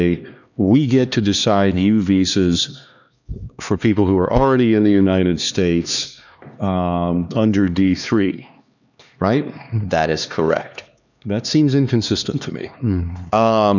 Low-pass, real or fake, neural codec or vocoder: 7.2 kHz; fake; codec, 16 kHz, 2 kbps, X-Codec, HuBERT features, trained on LibriSpeech